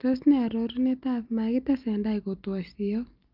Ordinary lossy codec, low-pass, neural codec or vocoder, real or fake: Opus, 24 kbps; 5.4 kHz; none; real